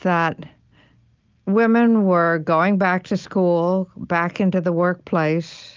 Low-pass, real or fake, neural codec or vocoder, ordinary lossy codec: 7.2 kHz; real; none; Opus, 24 kbps